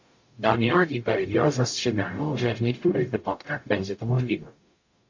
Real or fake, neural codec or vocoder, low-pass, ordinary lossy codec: fake; codec, 44.1 kHz, 0.9 kbps, DAC; 7.2 kHz; AAC, 48 kbps